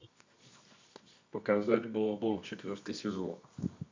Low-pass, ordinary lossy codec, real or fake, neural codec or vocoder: 7.2 kHz; MP3, 64 kbps; fake; codec, 24 kHz, 0.9 kbps, WavTokenizer, medium music audio release